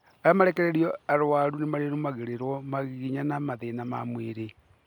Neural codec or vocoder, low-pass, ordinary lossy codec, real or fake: none; 19.8 kHz; none; real